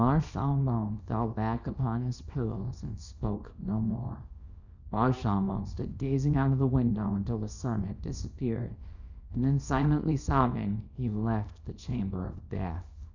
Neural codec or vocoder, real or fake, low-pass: codec, 24 kHz, 0.9 kbps, WavTokenizer, small release; fake; 7.2 kHz